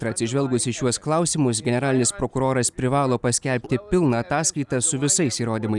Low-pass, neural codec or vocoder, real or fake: 10.8 kHz; none; real